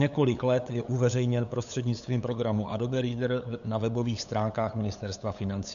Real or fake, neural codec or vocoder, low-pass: fake; codec, 16 kHz, 8 kbps, FunCodec, trained on LibriTTS, 25 frames a second; 7.2 kHz